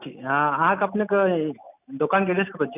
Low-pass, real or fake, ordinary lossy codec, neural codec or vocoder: 3.6 kHz; real; none; none